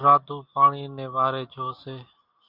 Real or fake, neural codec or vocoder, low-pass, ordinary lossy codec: real; none; 5.4 kHz; Opus, 64 kbps